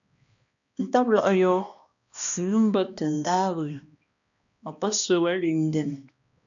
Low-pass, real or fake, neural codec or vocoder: 7.2 kHz; fake; codec, 16 kHz, 1 kbps, X-Codec, HuBERT features, trained on balanced general audio